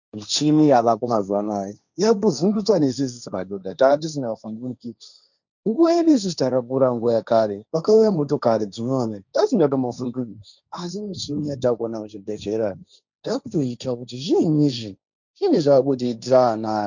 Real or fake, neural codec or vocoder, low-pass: fake; codec, 16 kHz, 1.1 kbps, Voila-Tokenizer; 7.2 kHz